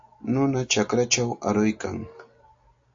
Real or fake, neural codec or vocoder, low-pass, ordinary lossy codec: real; none; 7.2 kHz; AAC, 64 kbps